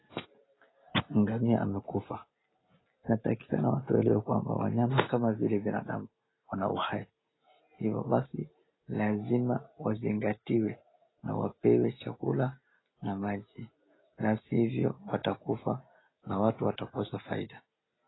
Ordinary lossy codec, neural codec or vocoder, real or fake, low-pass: AAC, 16 kbps; none; real; 7.2 kHz